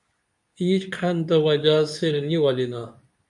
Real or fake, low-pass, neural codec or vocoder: fake; 10.8 kHz; codec, 24 kHz, 0.9 kbps, WavTokenizer, medium speech release version 2